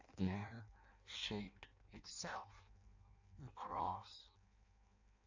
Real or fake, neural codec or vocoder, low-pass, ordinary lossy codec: fake; codec, 16 kHz in and 24 kHz out, 0.6 kbps, FireRedTTS-2 codec; 7.2 kHz; AAC, 48 kbps